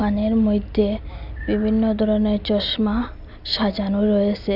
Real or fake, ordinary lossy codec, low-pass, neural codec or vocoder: real; none; 5.4 kHz; none